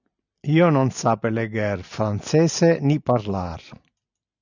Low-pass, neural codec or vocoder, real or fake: 7.2 kHz; none; real